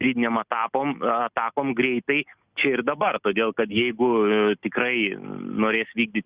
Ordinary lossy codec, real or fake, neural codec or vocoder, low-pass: Opus, 64 kbps; real; none; 3.6 kHz